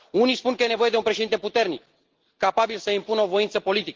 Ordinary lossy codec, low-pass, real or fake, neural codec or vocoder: Opus, 16 kbps; 7.2 kHz; real; none